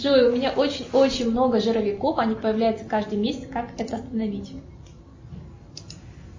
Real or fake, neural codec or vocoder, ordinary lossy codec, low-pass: real; none; MP3, 32 kbps; 7.2 kHz